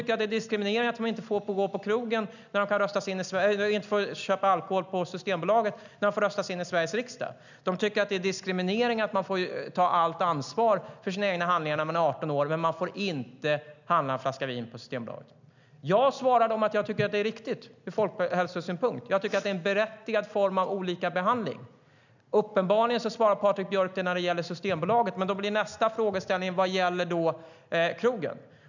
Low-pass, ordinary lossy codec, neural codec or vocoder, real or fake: 7.2 kHz; none; none; real